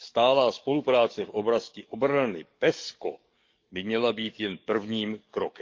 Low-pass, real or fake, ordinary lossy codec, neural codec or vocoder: 7.2 kHz; fake; Opus, 24 kbps; codec, 44.1 kHz, 7.8 kbps, DAC